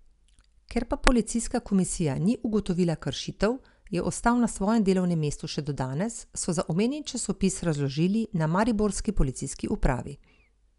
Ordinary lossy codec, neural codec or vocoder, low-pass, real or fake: none; none; 10.8 kHz; real